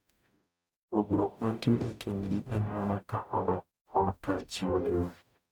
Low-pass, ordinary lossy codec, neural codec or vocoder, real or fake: 19.8 kHz; none; codec, 44.1 kHz, 0.9 kbps, DAC; fake